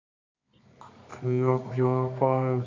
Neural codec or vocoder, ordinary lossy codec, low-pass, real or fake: codec, 24 kHz, 0.9 kbps, WavTokenizer, medium music audio release; MP3, 64 kbps; 7.2 kHz; fake